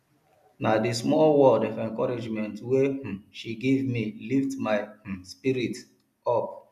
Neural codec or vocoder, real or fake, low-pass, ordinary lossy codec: none; real; 14.4 kHz; MP3, 96 kbps